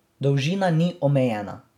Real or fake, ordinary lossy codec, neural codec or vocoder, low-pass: real; none; none; 19.8 kHz